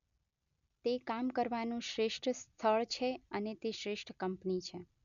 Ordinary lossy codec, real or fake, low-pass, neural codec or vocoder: none; real; 7.2 kHz; none